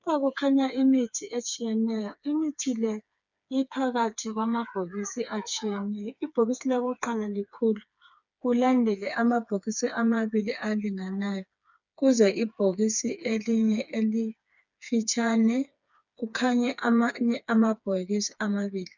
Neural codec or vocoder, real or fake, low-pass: codec, 16 kHz, 4 kbps, FreqCodec, smaller model; fake; 7.2 kHz